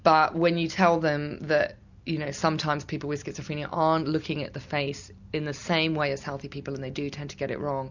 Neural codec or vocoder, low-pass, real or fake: none; 7.2 kHz; real